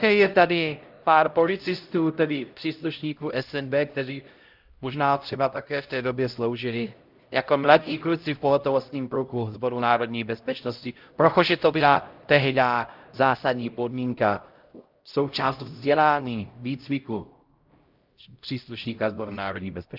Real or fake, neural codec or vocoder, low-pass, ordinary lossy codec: fake; codec, 16 kHz, 0.5 kbps, X-Codec, HuBERT features, trained on LibriSpeech; 5.4 kHz; Opus, 32 kbps